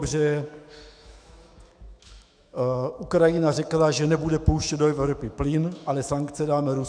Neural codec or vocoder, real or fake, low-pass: autoencoder, 48 kHz, 128 numbers a frame, DAC-VAE, trained on Japanese speech; fake; 9.9 kHz